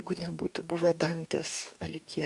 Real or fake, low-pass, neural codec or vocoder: fake; 10.8 kHz; codec, 44.1 kHz, 2.6 kbps, DAC